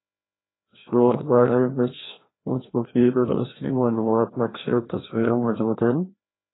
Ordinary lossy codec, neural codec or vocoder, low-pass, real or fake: AAC, 16 kbps; codec, 16 kHz, 1 kbps, FreqCodec, larger model; 7.2 kHz; fake